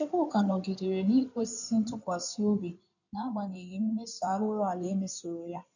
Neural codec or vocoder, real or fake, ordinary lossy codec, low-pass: codec, 16 kHz in and 24 kHz out, 2.2 kbps, FireRedTTS-2 codec; fake; none; 7.2 kHz